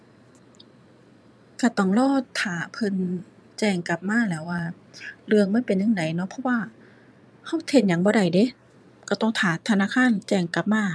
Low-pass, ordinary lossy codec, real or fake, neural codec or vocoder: none; none; fake; vocoder, 22.05 kHz, 80 mel bands, Vocos